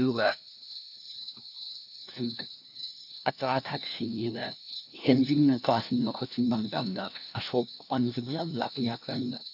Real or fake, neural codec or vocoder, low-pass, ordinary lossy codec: fake; codec, 16 kHz, 1 kbps, FunCodec, trained on LibriTTS, 50 frames a second; 5.4 kHz; none